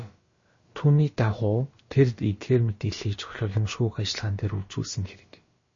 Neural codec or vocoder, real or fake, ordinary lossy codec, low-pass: codec, 16 kHz, about 1 kbps, DyCAST, with the encoder's durations; fake; MP3, 32 kbps; 7.2 kHz